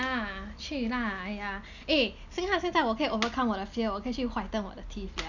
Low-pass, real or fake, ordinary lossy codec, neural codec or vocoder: 7.2 kHz; real; none; none